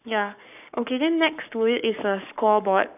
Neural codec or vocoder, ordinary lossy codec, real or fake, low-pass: codec, 44.1 kHz, 7.8 kbps, Pupu-Codec; none; fake; 3.6 kHz